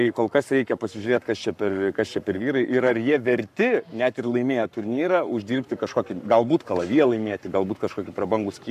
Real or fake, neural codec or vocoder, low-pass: fake; codec, 44.1 kHz, 7.8 kbps, Pupu-Codec; 14.4 kHz